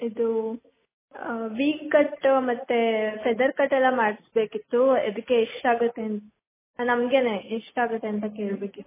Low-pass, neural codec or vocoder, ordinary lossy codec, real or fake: 3.6 kHz; none; MP3, 16 kbps; real